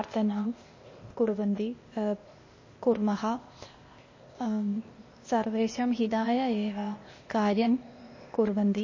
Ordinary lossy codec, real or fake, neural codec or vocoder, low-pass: MP3, 32 kbps; fake; codec, 16 kHz, 0.8 kbps, ZipCodec; 7.2 kHz